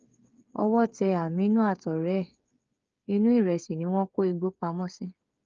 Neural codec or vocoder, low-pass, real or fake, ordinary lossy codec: codec, 16 kHz, 8 kbps, FreqCodec, smaller model; 7.2 kHz; fake; Opus, 24 kbps